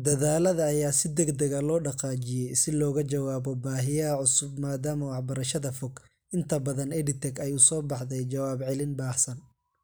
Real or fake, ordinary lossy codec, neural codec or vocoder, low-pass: real; none; none; none